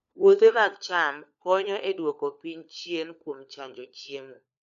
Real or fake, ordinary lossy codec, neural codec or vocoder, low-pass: fake; none; codec, 16 kHz, 4 kbps, FunCodec, trained on LibriTTS, 50 frames a second; 7.2 kHz